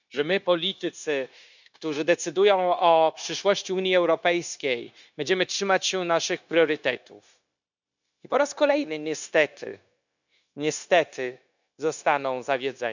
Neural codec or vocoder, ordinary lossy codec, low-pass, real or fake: codec, 16 kHz, 0.9 kbps, LongCat-Audio-Codec; none; 7.2 kHz; fake